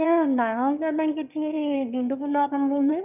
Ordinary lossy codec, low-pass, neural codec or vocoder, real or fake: none; 3.6 kHz; autoencoder, 22.05 kHz, a latent of 192 numbers a frame, VITS, trained on one speaker; fake